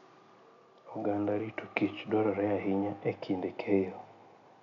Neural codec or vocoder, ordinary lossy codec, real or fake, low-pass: none; none; real; 7.2 kHz